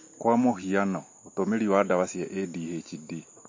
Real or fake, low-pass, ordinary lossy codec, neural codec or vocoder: real; 7.2 kHz; MP3, 32 kbps; none